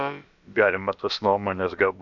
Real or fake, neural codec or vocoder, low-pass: fake; codec, 16 kHz, about 1 kbps, DyCAST, with the encoder's durations; 7.2 kHz